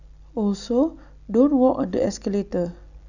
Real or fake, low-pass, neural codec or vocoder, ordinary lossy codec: real; 7.2 kHz; none; none